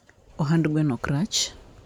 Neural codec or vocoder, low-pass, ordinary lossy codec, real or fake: none; 19.8 kHz; none; real